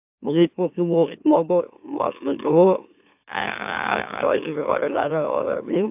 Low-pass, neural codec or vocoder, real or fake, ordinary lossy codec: 3.6 kHz; autoencoder, 44.1 kHz, a latent of 192 numbers a frame, MeloTTS; fake; AAC, 32 kbps